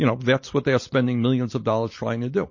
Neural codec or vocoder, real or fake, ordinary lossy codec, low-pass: none; real; MP3, 32 kbps; 7.2 kHz